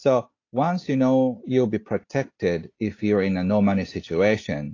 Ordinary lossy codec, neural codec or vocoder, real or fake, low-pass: AAC, 32 kbps; none; real; 7.2 kHz